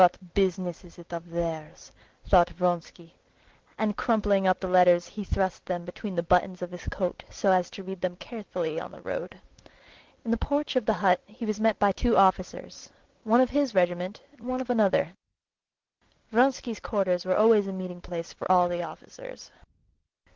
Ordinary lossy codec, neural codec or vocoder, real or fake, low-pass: Opus, 16 kbps; none; real; 7.2 kHz